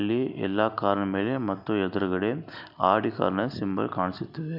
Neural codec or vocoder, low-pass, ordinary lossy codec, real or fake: none; 5.4 kHz; none; real